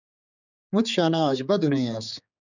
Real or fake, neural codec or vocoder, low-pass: fake; codec, 16 kHz, 4 kbps, X-Codec, HuBERT features, trained on general audio; 7.2 kHz